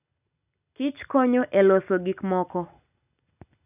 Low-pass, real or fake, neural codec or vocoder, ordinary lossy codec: 3.6 kHz; real; none; none